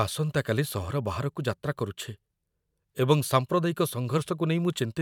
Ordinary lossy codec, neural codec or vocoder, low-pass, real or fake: none; vocoder, 44.1 kHz, 128 mel bands every 512 samples, BigVGAN v2; 19.8 kHz; fake